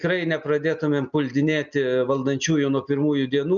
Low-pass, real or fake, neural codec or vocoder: 7.2 kHz; real; none